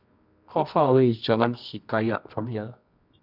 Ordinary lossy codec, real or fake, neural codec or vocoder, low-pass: none; fake; codec, 24 kHz, 0.9 kbps, WavTokenizer, medium music audio release; 5.4 kHz